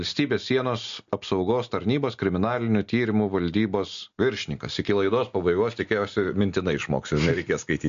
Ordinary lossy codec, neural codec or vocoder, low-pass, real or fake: MP3, 48 kbps; none; 7.2 kHz; real